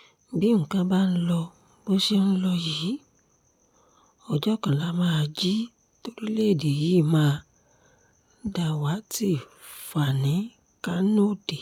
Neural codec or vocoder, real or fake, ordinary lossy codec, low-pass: none; real; none; 19.8 kHz